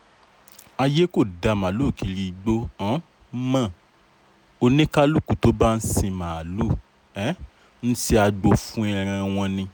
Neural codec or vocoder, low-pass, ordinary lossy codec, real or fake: none; none; none; real